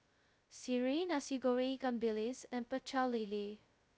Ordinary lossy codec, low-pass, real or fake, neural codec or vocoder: none; none; fake; codec, 16 kHz, 0.2 kbps, FocalCodec